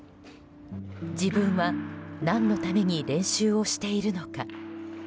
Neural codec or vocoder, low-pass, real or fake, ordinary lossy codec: none; none; real; none